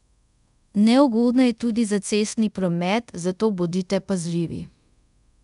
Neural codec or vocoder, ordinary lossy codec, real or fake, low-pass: codec, 24 kHz, 0.5 kbps, DualCodec; none; fake; 10.8 kHz